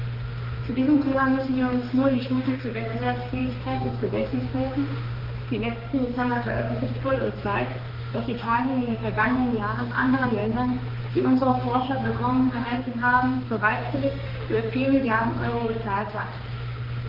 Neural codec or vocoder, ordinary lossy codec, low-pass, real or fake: codec, 16 kHz, 2 kbps, X-Codec, HuBERT features, trained on balanced general audio; Opus, 24 kbps; 5.4 kHz; fake